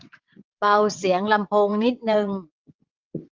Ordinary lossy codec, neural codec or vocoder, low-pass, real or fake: Opus, 24 kbps; vocoder, 22.05 kHz, 80 mel bands, WaveNeXt; 7.2 kHz; fake